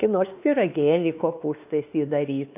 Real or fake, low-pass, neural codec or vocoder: fake; 3.6 kHz; codec, 16 kHz, 2 kbps, X-Codec, WavLM features, trained on Multilingual LibriSpeech